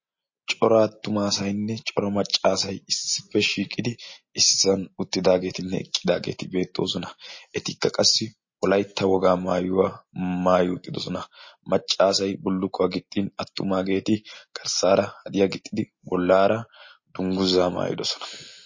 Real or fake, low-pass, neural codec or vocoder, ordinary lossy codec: real; 7.2 kHz; none; MP3, 32 kbps